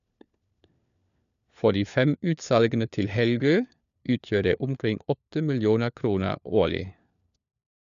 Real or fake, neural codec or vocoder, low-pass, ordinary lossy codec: fake; codec, 16 kHz, 4 kbps, FunCodec, trained on LibriTTS, 50 frames a second; 7.2 kHz; none